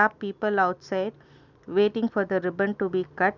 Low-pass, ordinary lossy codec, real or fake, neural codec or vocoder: 7.2 kHz; none; real; none